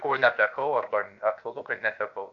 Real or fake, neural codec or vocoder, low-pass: fake; codec, 16 kHz, about 1 kbps, DyCAST, with the encoder's durations; 7.2 kHz